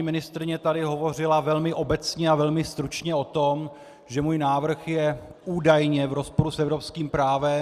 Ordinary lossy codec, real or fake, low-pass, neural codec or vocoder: Opus, 64 kbps; real; 14.4 kHz; none